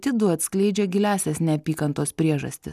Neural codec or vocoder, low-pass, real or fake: none; 14.4 kHz; real